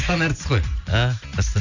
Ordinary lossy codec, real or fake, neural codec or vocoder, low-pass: none; real; none; 7.2 kHz